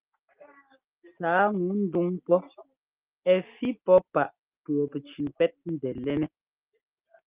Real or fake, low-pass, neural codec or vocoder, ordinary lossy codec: real; 3.6 kHz; none; Opus, 32 kbps